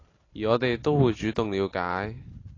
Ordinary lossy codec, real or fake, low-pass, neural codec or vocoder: AAC, 32 kbps; real; 7.2 kHz; none